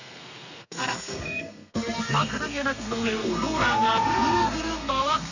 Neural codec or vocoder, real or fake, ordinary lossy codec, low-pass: codec, 32 kHz, 1.9 kbps, SNAC; fake; none; 7.2 kHz